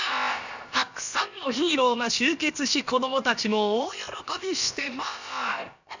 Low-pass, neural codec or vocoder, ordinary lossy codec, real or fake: 7.2 kHz; codec, 16 kHz, about 1 kbps, DyCAST, with the encoder's durations; none; fake